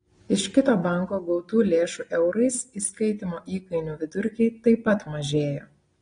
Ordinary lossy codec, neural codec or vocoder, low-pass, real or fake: AAC, 32 kbps; none; 9.9 kHz; real